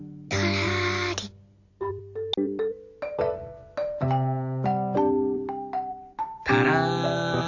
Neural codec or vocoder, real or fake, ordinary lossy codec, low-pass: none; real; none; 7.2 kHz